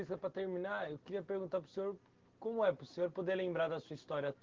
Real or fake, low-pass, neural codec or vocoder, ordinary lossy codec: real; 7.2 kHz; none; Opus, 16 kbps